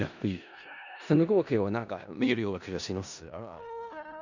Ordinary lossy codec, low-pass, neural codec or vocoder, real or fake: none; 7.2 kHz; codec, 16 kHz in and 24 kHz out, 0.4 kbps, LongCat-Audio-Codec, four codebook decoder; fake